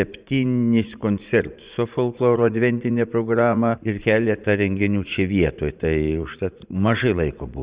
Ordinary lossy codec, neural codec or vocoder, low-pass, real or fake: Opus, 64 kbps; vocoder, 44.1 kHz, 80 mel bands, Vocos; 3.6 kHz; fake